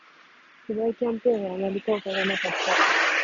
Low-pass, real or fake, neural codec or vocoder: 7.2 kHz; real; none